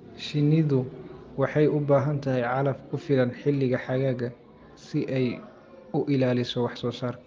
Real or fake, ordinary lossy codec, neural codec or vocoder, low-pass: real; Opus, 24 kbps; none; 7.2 kHz